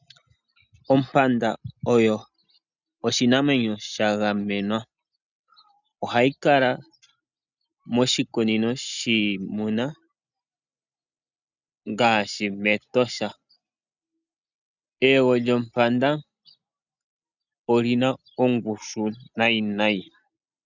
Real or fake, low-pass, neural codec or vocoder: real; 7.2 kHz; none